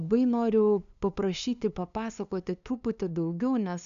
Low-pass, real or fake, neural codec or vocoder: 7.2 kHz; fake; codec, 16 kHz, 2 kbps, FunCodec, trained on LibriTTS, 25 frames a second